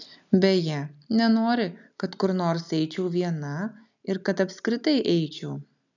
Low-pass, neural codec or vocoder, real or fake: 7.2 kHz; none; real